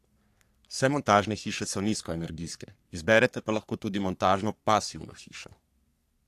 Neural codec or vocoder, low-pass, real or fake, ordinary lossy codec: codec, 44.1 kHz, 3.4 kbps, Pupu-Codec; 14.4 kHz; fake; AAC, 64 kbps